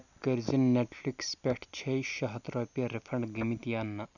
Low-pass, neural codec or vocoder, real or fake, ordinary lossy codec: 7.2 kHz; none; real; none